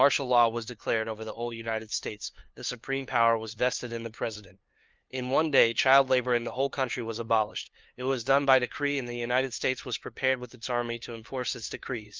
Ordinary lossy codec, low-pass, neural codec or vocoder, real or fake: Opus, 24 kbps; 7.2 kHz; codec, 16 kHz, 2 kbps, FunCodec, trained on LibriTTS, 25 frames a second; fake